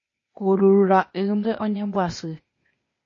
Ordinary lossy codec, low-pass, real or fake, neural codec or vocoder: MP3, 32 kbps; 7.2 kHz; fake; codec, 16 kHz, 0.8 kbps, ZipCodec